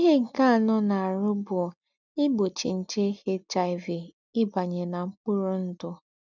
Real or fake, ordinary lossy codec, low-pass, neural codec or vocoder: real; none; 7.2 kHz; none